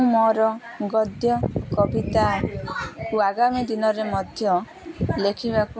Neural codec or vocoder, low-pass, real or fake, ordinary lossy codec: none; none; real; none